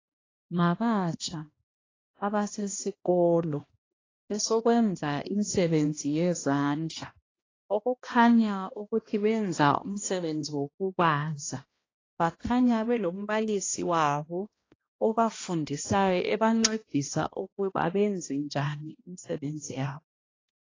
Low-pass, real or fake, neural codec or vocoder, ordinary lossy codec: 7.2 kHz; fake; codec, 16 kHz, 1 kbps, X-Codec, HuBERT features, trained on balanced general audio; AAC, 32 kbps